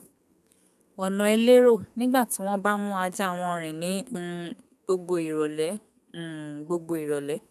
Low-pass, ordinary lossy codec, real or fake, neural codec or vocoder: 14.4 kHz; none; fake; codec, 32 kHz, 1.9 kbps, SNAC